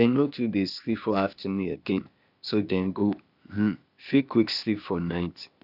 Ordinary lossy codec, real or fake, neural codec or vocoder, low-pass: none; fake; codec, 16 kHz, 0.8 kbps, ZipCodec; 5.4 kHz